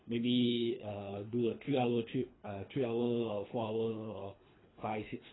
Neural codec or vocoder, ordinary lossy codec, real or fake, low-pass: codec, 24 kHz, 3 kbps, HILCodec; AAC, 16 kbps; fake; 7.2 kHz